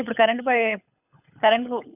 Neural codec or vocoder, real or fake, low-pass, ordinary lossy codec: codec, 24 kHz, 6 kbps, HILCodec; fake; 3.6 kHz; none